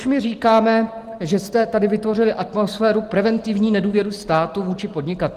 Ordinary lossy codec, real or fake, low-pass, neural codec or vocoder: Opus, 24 kbps; real; 14.4 kHz; none